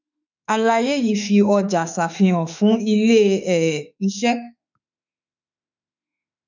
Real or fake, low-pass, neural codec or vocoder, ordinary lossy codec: fake; 7.2 kHz; autoencoder, 48 kHz, 32 numbers a frame, DAC-VAE, trained on Japanese speech; none